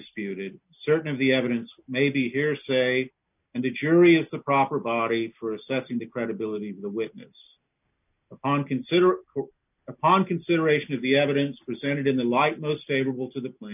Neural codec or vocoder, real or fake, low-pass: none; real; 3.6 kHz